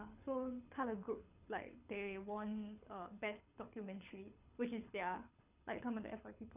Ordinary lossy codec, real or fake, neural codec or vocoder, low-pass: none; fake; codec, 24 kHz, 6 kbps, HILCodec; 3.6 kHz